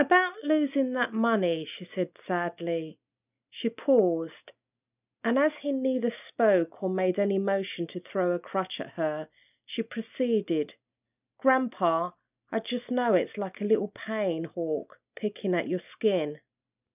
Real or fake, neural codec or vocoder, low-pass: real; none; 3.6 kHz